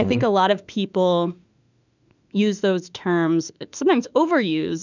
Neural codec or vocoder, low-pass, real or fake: autoencoder, 48 kHz, 32 numbers a frame, DAC-VAE, trained on Japanese speech; 7.2 kHz; fake